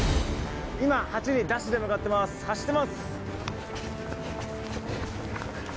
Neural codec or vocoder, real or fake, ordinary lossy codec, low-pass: none; real; none; none